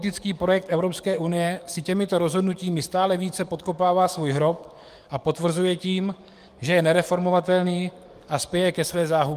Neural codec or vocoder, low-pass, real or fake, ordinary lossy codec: codec, 44.1 kHz, 7.8 kbps, DAC; 14.4 kHz; fake; Opus, 32 kbps